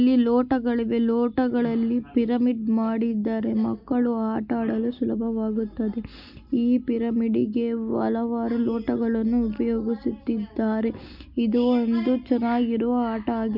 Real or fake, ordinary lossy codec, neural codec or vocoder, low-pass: real; none; none; 5.4 kHz